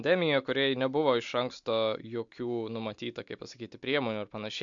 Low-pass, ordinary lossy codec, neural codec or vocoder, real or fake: 7.2 kHz; MP3, 64 kbps; none; real